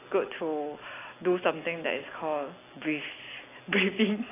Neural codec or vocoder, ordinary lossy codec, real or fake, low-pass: none; AAC, 16 kbps; real; 3.6 kHz